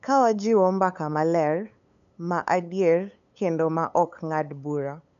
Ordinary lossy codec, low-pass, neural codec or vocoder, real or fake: none; 7.2 kHz; codec, 16 kHz, 8 kbps, FunCodec, trained on LibriTTS, 25 frames a second; fake